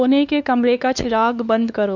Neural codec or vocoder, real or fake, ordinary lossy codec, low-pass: codec, 16 kHz, 2 kbps, X-Codec, WavLM features, trained on Multilingual LibriSpeech; fake; none; 7.2 kHz